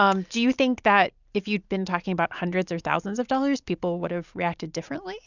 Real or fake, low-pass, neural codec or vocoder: real; 7.2 kHz; none